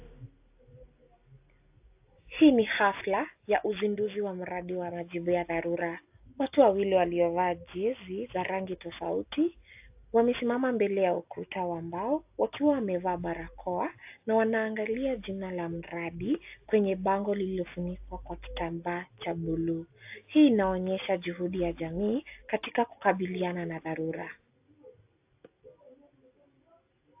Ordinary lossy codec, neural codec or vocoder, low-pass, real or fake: AAC, 32 kbps; none; 3.6 kHz; real